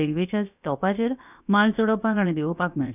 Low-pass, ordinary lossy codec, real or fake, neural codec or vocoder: 3.6 kHz; none; fake; codec, 16 kHz, about 1 kbps, DyCAST, with the encoder's durations